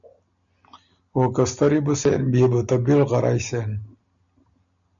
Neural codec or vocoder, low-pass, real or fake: none; 7.2 kHz; real